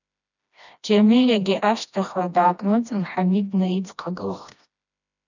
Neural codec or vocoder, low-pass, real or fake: codec, 16 kHz, 1 kbps, FreqCodec, smaller model; 7.2 kHz; fake